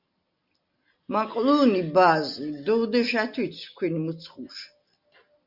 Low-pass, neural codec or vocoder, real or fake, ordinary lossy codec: 5.4 kHz; none; real; Opus, 64 kbps